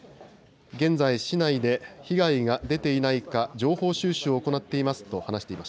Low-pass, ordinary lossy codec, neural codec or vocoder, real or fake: none; none; none; real